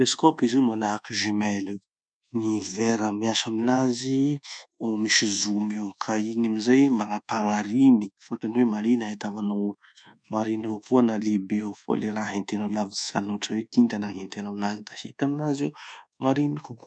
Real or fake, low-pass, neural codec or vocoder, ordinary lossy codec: fake; 9.9 kHz; codec, 24 kHz, 1.2 kbps, DualCodec; none